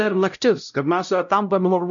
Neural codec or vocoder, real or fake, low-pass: codec, 16 kHz, 0.5 kbps, X-Codec, WavLM features, trained on Multilingual LibriSpeech; fake; 7.2 kHz